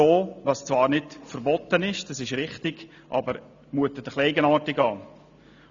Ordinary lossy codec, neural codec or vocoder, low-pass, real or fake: AAC, 64 kbps; none; 7.2 kHz; real